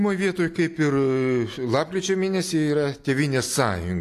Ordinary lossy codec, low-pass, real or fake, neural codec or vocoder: AAC, 64 kbps; 14.4 kHz; real; none